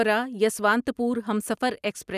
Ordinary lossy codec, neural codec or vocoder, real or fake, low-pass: none; none; real; 14.4 kHz